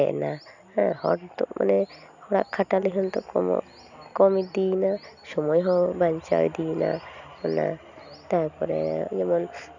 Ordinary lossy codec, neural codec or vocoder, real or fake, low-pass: none; none; real; 7.2 kHz